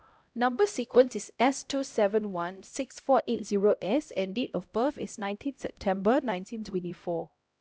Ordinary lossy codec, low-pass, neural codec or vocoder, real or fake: none; none; codec, 16 kHz, 0.5 kbps, X-Codec, HuBERT features, trained on LibriSpeech; fake